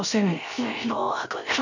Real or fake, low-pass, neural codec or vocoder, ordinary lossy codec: fake; 7.2 kHz; codec, 16 kHz, 0.3 kbps, FocalCodec; none